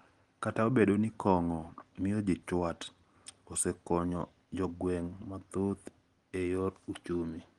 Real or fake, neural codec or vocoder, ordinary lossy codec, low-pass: real; none; Opus, 24 kbps; 10.8 kHz